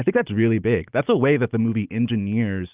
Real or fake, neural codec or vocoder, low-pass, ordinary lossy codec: real; none; 3.6 kHz; Opus, 32 kbps